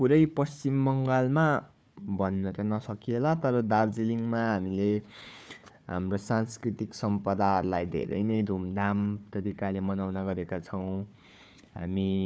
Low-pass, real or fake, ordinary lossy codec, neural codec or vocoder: none; fake; none; codec, 16 kHz, 4 kbps, FunCodec, trained on Chinese and English, 50 frames a second